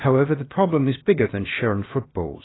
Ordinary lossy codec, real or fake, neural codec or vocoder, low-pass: AAC, 16 kbps; fake; codec, 16 kHz, about 1 kbps, DyCAST, with the encoder's durations; 7.2 kHz